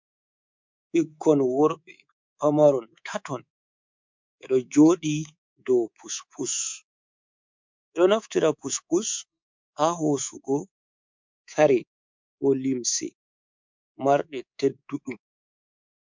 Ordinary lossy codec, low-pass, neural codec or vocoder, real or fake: MP3, 64 kbps; 7.2 kHz; codec, 24 kHz, 3.1 kbps, DualCodec; fake